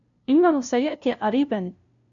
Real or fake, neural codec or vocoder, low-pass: fake; codec, 16 kHz, 0.5 kbps, FunCodec, trained on LibriTTS, 25 frames a second; 7.2 kHz